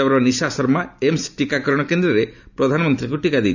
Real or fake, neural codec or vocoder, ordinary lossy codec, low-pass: real; none; none; 7.2 kHz